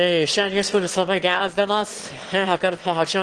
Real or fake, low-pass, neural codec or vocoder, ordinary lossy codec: fake; 9.9 kHz; autoencoder, 22.05 kHz, a latent of 192 numbers a frame, VITS, trained on one speaker; Opus, 16 kbps